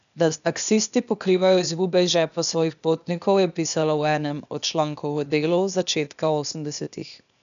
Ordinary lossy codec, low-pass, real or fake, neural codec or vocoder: none; 7.2 kHz; fake; codec, 16 kHz, 0.8 kbps, ZipCodec